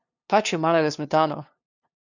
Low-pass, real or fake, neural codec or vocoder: 7.2 kHz; fake; codec, 16 kHz, 2 kbps, FunCodec, trained on LibriTTS, 25 frames a second